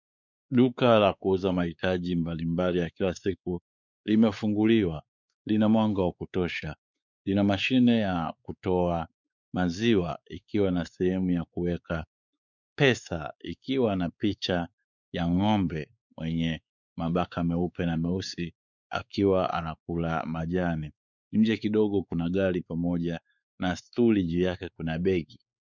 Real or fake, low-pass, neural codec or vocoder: fake; 7.2 kHz; codec, 16 kHz, 4 kbps, X-Codec, WavLM features, trained on Multilingual LibriSpeech